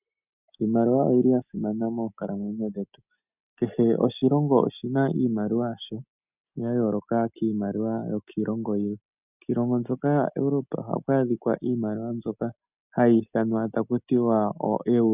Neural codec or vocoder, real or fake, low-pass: none; real; 3.6 kHz